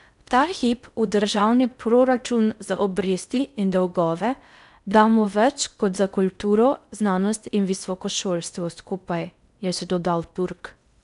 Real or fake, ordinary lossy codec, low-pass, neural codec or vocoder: fake; none; 10.8 kHz; codec, 16 kHz in and 24 kHz out, 0.6 kbps, FocalCodec, streaming, 4096 codes